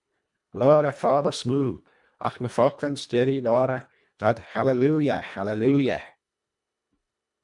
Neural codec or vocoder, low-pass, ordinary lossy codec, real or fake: codec, 24 kHz, 1.5 kbps, HILCodec; 10.8 kHz; MP3, 96 kbps; fake